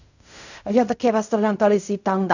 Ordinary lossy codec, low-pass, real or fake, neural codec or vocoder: none; 7.2 kHz; fake; codec, 16 kHz in and 24 kHz out, 0.4 kbps, LongCat-Audio-Codec, fine tuned four codebook decoder